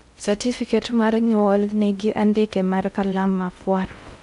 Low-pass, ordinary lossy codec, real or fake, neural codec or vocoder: 10.8 kHz; none; fake; codec, 16 kHz in and 24 kHz out, 0.6 kbps, FocalCodec, streaming, 2048 codes